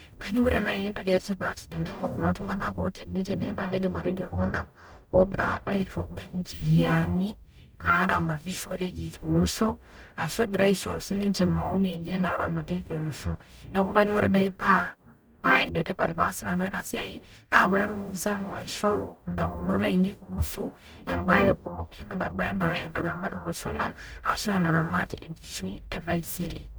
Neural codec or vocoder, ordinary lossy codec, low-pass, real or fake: codec, 44.1 kHz, 0.9 kbps, DAC; none; none; fake